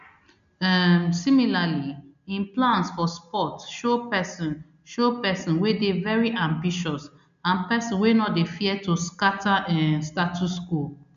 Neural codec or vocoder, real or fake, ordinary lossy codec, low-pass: none; real; none; 7.2 kHz